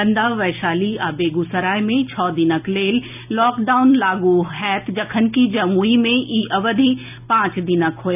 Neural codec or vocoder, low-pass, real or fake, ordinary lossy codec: none; 3.6 kHz; real; none